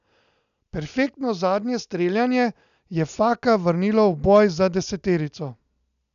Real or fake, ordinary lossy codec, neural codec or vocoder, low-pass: real; none; none; 7.2 kHz